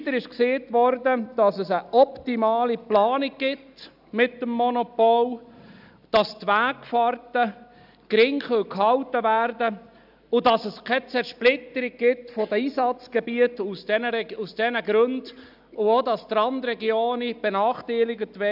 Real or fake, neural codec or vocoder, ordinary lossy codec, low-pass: real; none; none; 5.4 kHz